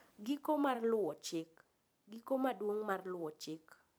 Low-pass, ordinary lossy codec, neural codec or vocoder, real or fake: none; none; vocoder, 44.1 kHz, 128 mel bands every 512 samples, BigVGAN v2; fake